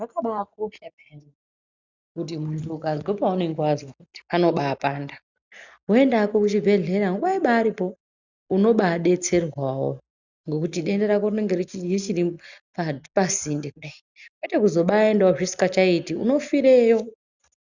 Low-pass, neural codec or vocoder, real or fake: 7.2 kHz; none; real